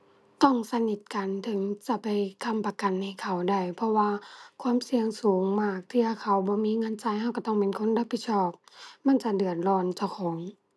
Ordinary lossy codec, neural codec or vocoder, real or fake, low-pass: none; none; real; none